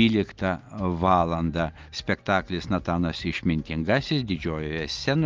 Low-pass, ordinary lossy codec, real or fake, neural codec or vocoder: 7.2 kHz; Opus, 24 kbps; real; none